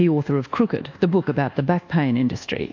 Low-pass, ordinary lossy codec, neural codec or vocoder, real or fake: 7.2 kHz; AAC, 48 kbps; codec, 24 kHz, 1.2 kbps, DualCodec; fake